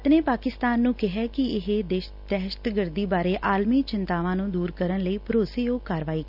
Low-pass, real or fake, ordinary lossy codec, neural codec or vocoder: 5.4 kHz; real; none; none